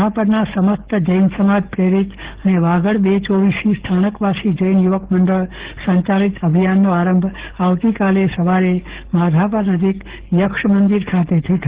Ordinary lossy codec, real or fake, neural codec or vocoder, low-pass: Opus, 16 kbps; fake; codec, 44.1 kHz, 7.8 kbps, DAC; 3.6 kHz